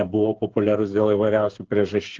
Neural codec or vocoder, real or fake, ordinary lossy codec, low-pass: codec, 16 kHz, 8 kbps, FreqCodec, smaller model; fake; Opus, 16 kbps; 7.2 kHz